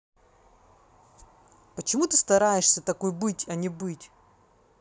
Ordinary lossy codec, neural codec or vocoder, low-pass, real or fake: none; none; none; real